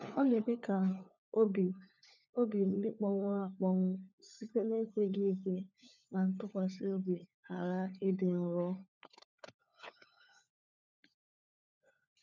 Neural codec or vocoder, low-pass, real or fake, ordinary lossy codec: codec, 16 kHz, 4 kbps, FunCodec, trained on LibriTTS, 50 frames a second; 7.2 kHz; fake; none